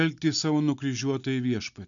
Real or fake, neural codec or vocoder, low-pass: real; none; 7.2 kHz